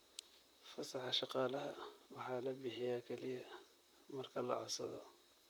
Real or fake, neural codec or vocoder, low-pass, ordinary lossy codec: fake; vocoder, 44.1 kHz, 128 mel bands, Pupu-Vocoder; none; none